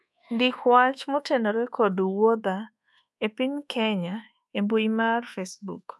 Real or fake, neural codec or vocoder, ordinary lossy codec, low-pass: fake; codec, 24 kHz, 1.2 kbps, DualCodec; none; none